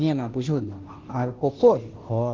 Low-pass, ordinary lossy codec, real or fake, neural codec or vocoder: 7.2 kHz; Opus, 16 kbps; fake; codec, 16 kHz, 0.5 kbps, FunCodec, trained on Chinese and English, 25 frames a second